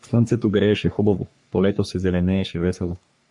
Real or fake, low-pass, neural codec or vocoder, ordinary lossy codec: fake; 10.8 kHz; codec, 44.1 kHz, 3.4 kbps, Pupu-Codec; MP3, 64 kbps